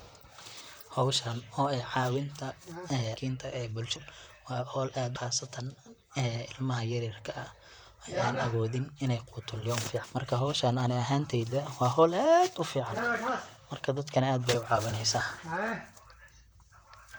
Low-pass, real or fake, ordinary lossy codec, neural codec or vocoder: none; fake; none; vocoder, 44.1 kHz, 128 mel bands, Pupu-Vocoder